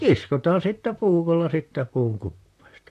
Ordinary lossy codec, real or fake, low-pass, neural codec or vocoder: AAC, 64 kbps; fake; 14.4 kHz; vocoder, 44.1 kHz, 128 mel bands, Pupu-Vocoder